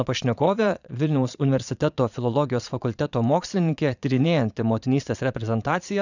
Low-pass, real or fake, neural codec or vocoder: 7.2 kHz; fake; vocoder, 22.05 kHz, 80 mel bands, Vocos